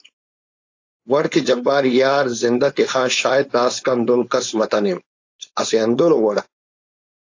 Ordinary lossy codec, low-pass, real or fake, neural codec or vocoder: AAC, 48 kbps; 7.2 kHz; fake; codec, 16 kHz, 4.8 kbps, FACodec